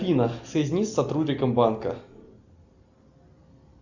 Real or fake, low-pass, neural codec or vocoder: real; 7.2 kHz; none